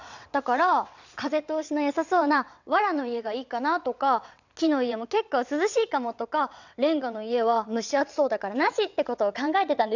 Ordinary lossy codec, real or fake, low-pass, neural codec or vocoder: none; fake; 7.2 kHz; vocoder, 22.05 kHz, 80 mel bands, WaveNeXt